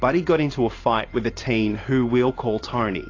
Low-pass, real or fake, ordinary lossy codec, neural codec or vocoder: 7.2 kHz; fake; AAC, 32 kbps; vocoder, 44.1 kHz, 128 mel bands every 512 samples, BigVGAN v2